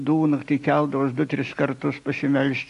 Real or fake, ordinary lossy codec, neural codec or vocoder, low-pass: real; AAC, 64 kbps; none; 10.8 kHz